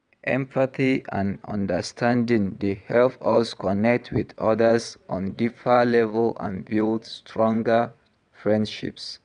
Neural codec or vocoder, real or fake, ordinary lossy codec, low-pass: vocoder, 22.05 kHz, 80 mel bands, WaveNeXt; fake; none; 9.9 kHz